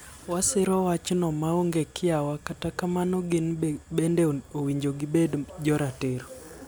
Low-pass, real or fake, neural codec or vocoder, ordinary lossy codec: none; real; none; none